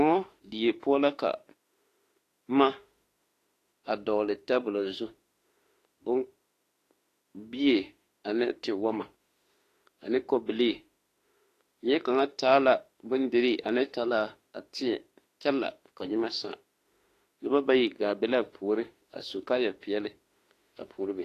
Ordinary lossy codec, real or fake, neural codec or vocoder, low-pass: AAC, 48 kbps; fake; autoencoder, 48 kHz, 32 numbers a frame, DAC-VAE, trained on Japanese speech; 14.4 kHz